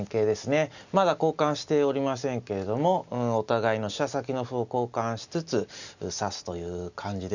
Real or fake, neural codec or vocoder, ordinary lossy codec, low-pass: real; none; none; 7.2 kHz